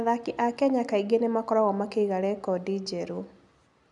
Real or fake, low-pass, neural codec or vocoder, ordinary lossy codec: real; 10.8 kHz; none; none